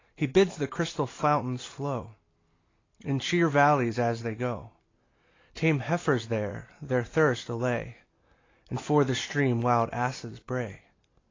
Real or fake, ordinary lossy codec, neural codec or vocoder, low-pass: real; AAC, 32 kbps; none; 7.2 kHz